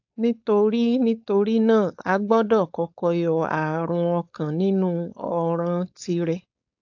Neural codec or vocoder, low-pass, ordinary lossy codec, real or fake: codec, 16 kHz, 4.8 kbps, FACodec; 7.2 kHz; AAC, 48 kbps; fake